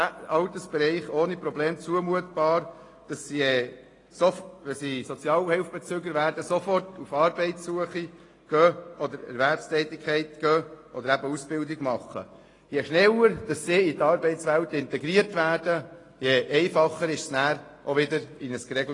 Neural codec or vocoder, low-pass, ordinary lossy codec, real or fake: none; 10.8 kHz; AAC, 32 kbps; real